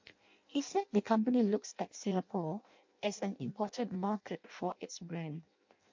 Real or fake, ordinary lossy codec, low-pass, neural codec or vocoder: fake; MP3, 48 kbps; 7.2 kHz; codec, 16 kHz in and 24 kHz out, 0.6 kbps, FireRedTTS-2 codec